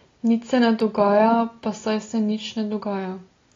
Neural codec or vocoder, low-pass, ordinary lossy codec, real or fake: none; 7.2 kHz; AAC, 32 kbps; real